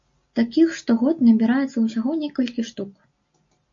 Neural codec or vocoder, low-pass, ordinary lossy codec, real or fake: none; 7.2 kHz; MP3, 48 kbps; real